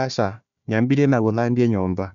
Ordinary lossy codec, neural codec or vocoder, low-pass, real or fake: none; codec, 16 kHz, 1 kbps, FunCodec, trained on Chinese and English, 50 frames a second; 7.2 kHz; fake